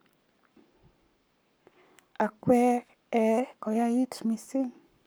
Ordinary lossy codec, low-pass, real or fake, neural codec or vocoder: none; none; fake; codec, 44.1 kHz, 7.8 kbps, Pupu-Codec